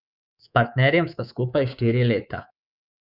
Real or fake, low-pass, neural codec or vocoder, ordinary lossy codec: fake; 5.4 kHz; codec, 24 kHz, 3.1 kbps, DualCodec; none